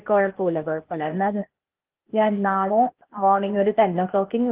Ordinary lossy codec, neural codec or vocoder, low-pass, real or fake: Opus, 16 kbps; codec, 16 kHz, 0.8 kbps, ZipCodec; 3.6 kHz; fake